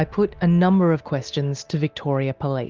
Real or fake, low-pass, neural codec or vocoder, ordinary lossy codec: real; 7.2 kHz; none; Opus, 32 kbps